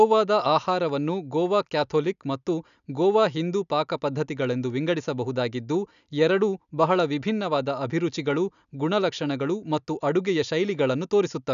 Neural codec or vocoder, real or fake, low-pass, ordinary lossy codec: none; real; 7.2 kHz; none